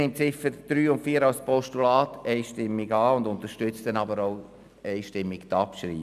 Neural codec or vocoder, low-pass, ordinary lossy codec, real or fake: none; 14.4 kHz; none; real